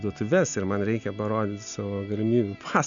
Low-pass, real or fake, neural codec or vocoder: 7.2 kHz; real; none